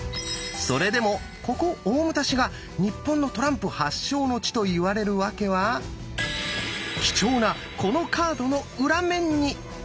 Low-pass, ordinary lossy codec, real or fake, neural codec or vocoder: none; none; real; none